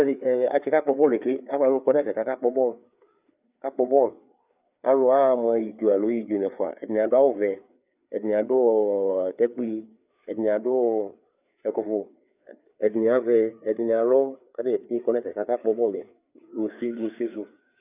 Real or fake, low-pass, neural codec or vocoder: fake; 3.6 kHz; codec, 16 kHz, 4 kbps, FreqCodec, larger model